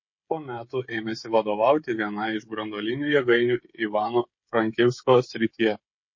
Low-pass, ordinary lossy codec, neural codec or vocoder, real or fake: 7.2 kHz; MP3, 32 kbps; codec, 16 kHz, 16 kbps, FreqCodec, smaller model; fake